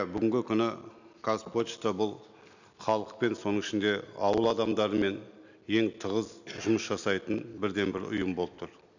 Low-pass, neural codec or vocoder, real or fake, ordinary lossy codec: 7.2 kHz; none; real; none